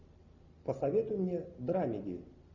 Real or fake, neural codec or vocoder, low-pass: real; none; 7.2 kHz